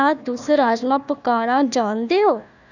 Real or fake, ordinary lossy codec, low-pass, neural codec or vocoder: fake; none; 7.2 kHz; codec, 16 kHz, 1 kbps, FunCodec, trained on Chinese and English, 50 frames a second